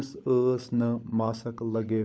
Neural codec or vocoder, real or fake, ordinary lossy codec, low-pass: codec, 16 kHz, 16 kbps, FunCodec, trained on LibriTTS, 50 frames a second; fake; none; none